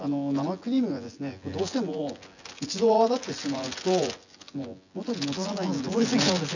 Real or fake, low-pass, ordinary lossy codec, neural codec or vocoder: fake; 7.2 kHz; none; vocoder, 24 kHz, 100 mel bands, Vocos